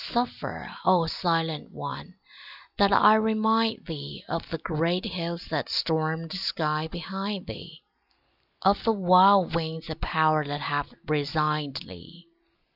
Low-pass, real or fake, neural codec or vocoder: 5.4 kHz; real; none